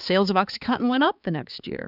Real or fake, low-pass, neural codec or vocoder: fake; 5.4 kHz; codec, 16 kHz, 4 kbps, X-Codec, HuBERT features, trained on balanced general audio